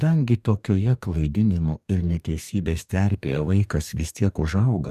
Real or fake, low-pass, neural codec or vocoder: fake; 14.4 kHz; codec, 44.1 kHz, 2.6 kbps, DAC